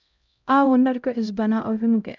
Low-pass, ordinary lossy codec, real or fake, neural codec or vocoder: 7.2 kHz; none; fake; codec, 16 kHz, 0.5 kbps, X-Codec, HuBERT features, trained on LibriSpeech